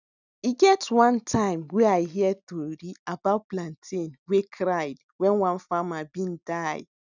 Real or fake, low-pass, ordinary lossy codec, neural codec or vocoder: real; 7.2 kHz; none; none